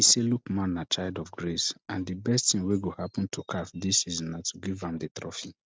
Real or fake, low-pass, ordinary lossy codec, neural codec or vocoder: real; none; none; none